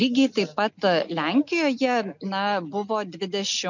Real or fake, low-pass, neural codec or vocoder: real; 7.2 kHz; none